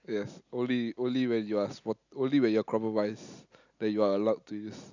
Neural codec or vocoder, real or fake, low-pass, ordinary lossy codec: none; real; 7.2 kHz; none